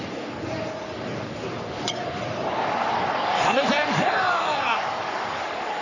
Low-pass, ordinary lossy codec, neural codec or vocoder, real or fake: 7.2 kHz; none; codec, 44.1 kHz, 3.4 kbps, Pupu-Codec; fake